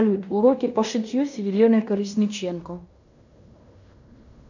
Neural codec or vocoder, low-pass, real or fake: codec, 16 kHz in and 24 kHz out, 0.9 kbps, LongCat-Audio-Codec, fine tuned four codebook decoder; 7.2 kHz; fake